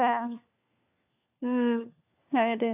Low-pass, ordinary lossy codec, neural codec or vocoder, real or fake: 3.6 kHz; AAC, 32 kbps; codec, 16 kHz, 4 kbps, FunCodec, trained on LibriTTS, 50 frames a second; fake